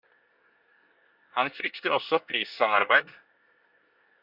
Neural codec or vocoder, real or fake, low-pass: codec, 24 kHz, 1 kbps, SNAC; fake; 5.4 kHz